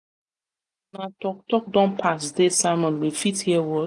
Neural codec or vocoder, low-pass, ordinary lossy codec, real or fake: none; 10.8 kHz; none; real